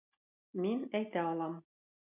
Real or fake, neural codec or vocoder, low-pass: real; none; 3.6 kHz